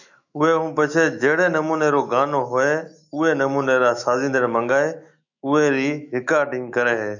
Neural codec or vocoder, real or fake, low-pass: autoencoder, 48 kHz, 128 numbers a frame, DAC-VAE, trained on Japanese speech; fake; 7.2 kHz